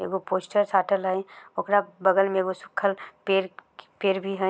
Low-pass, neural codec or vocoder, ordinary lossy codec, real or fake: none; none; none; real